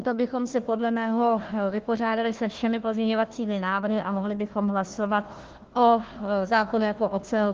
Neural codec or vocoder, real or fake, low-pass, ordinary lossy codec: codec, 16 kHz, 1 kbps, FunCodec, trained on Chinese and English, 50 frames a second; fake; 7.2 kHz; Opus, 16 kbps